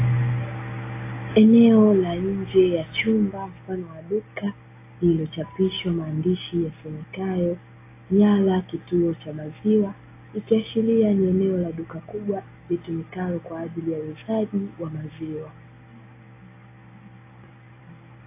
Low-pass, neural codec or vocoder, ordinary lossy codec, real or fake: 3.6 kHz; none; AAC, 24 kbps; real